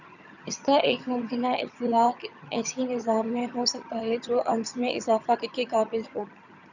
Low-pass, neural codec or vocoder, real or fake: 7.2 kHz; vocoder, 22.05 kHz, 80 mel bands, HiFi-GAN; fake